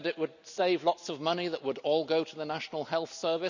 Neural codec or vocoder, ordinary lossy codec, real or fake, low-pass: none; none; real; 7.2 kHz